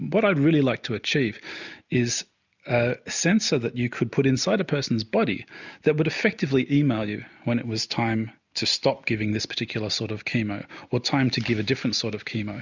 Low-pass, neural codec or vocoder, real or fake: 7.2 kHz; none; real